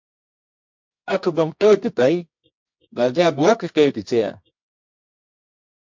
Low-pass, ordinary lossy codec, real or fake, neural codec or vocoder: 7.2 kHz; MP3, 48 kbps; fake; codec, 24 kHz, 0.9 kbps, WavTokenizer, medium music audio release